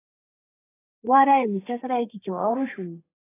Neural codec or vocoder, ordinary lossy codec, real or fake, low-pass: codec, 32 kHz, 1.9 kbps, SNAC; AAC, 16 kbps; fake; 3.6 kHz